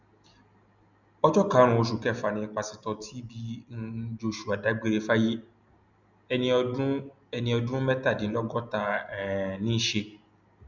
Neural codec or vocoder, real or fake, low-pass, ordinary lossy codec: none; real; 7.2 kHz; none